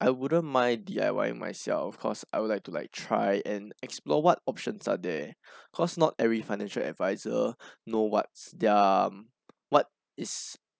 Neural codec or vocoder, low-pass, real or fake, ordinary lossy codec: none; none; real; none